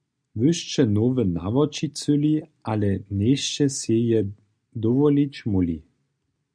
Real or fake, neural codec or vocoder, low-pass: real; none; 9.9 kHz